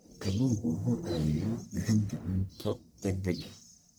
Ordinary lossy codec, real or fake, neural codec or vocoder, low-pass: none; fake; codec, 44.1 kHz, 1.7 kbps, Pupu-Codec; none